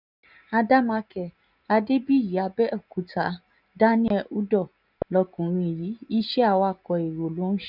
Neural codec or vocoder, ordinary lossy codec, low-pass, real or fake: none; none; 5.4 kHz; real